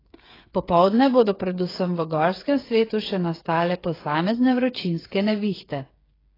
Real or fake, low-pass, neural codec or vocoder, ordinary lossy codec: fake; 5.4 kHz; codec, 16 kHz, 8 kbps, FreqCodec, smaller model; AAC, 24 kbps